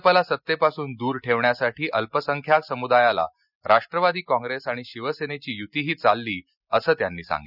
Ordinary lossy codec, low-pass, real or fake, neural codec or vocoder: none; 5.4 kHz; real; none